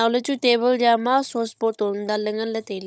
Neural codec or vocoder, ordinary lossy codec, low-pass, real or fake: codec, 16 kHz, 16 kbps, FunCodec, trained on Chinese and English, 50 frames a second; none; none; fake